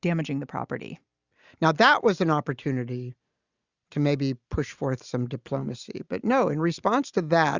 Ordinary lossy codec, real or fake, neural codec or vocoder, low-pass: Opus, 64 kbps; real; none; 7.2 kHz